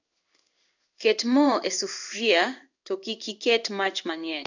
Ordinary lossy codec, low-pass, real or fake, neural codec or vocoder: none; 7.2 kHz; fake; codec, 16 kHz in and 24 kHz out, 1 kbps, XY-Tokenizer